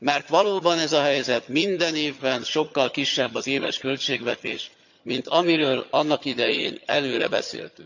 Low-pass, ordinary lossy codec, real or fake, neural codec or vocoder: 7.2 kHz; none; fake; vocoder, 22.05 kHz, 80 mel bands, HiFi-GAN